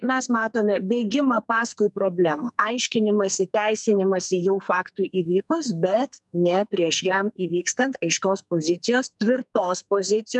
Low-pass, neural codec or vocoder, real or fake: 10.8 kHz; codec, 44.1 kHz, 2.6 kbps, SNAC; fake